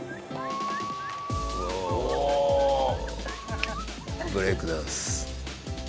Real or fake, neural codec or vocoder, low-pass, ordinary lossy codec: real; none; none; none